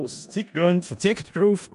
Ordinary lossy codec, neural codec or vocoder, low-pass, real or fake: none; codec, 16 kHz in and 24 kHz out, 0.4 kbps, LongCat-Audio-Codec, four codebook decoder; 10.8 kHz; fake